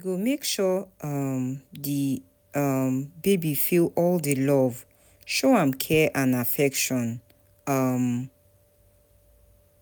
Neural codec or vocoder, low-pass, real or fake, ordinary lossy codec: none; none; real; none